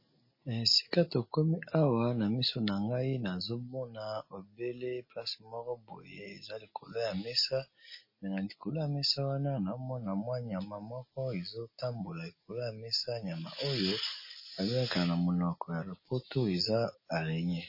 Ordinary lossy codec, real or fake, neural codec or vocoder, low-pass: MP3, 24 kbps; real; none; 5.4 kHz